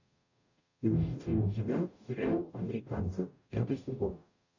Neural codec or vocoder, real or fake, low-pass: codec, 44.1 kHz, 0.9 kbps, DAC; fake; 7.2 kHz